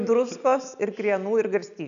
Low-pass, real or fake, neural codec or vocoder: 7.2 kHz; real; none